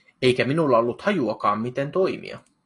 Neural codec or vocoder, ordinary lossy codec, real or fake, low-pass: none; AAC, 48 kbps; real; 10.8 kHz